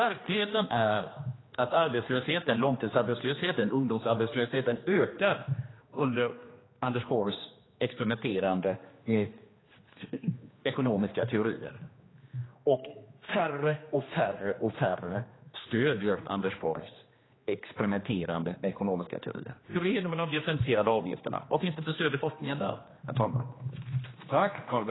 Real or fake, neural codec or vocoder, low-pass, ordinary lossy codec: fake; codec, 16 kHz, 2 kbps, X-Codec, HuBERT features, trained on general audio; 7.2 kHz; AAC, 16 kbps